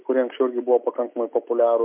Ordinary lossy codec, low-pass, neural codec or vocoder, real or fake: MP3, 32 kbps; 3.6 kHz; none; real